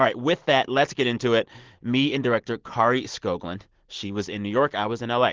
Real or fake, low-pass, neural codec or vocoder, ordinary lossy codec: real; 7.2 kHz; none; Opus, 16 kbps